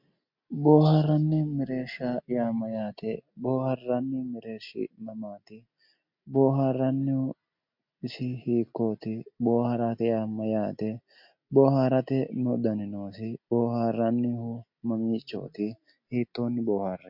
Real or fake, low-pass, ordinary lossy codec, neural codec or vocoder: real; 5.4 kHz; MP3, 48 kbps; none